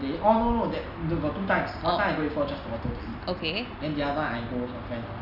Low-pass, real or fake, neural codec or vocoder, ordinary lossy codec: 5.4 kHz; real; none; none